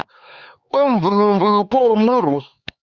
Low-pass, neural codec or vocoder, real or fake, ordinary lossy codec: 7.2 kHz; codec, 16 kHz, 4 kbps, X-Codec, HuBERT features, trained on LibriSpeech; fake; Opus, 64 kbps